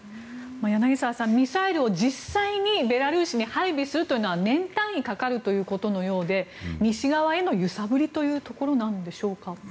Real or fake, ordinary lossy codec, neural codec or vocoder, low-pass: real; none; none; none